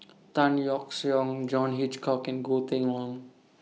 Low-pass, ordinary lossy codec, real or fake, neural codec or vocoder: none; none; real; none